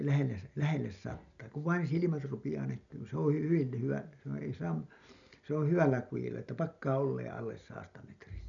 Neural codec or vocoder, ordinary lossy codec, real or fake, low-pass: none; none; real; 7.2 kHz